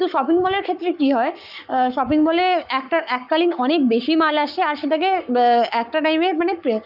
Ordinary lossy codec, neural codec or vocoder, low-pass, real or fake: none; codec, 44.1 kHz, 7.8 kbps, Pupu-Codec; 5.4 kHz; fake